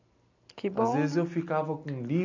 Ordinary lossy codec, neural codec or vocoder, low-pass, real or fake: none; none; 7.2 kHz; real